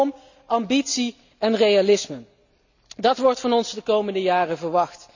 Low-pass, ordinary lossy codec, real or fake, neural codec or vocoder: 7.2 kHz; none; real; none